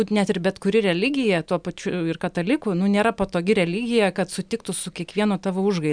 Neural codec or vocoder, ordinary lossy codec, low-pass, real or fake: none; MP3, 96 kbps; 9.9 kHz; real